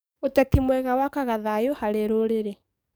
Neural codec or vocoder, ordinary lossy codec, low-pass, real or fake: codec, 44.1 kHz, 7.8 kbps, DAC; none; none; fake